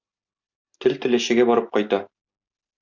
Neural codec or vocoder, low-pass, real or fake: none; 7.2 kHz; real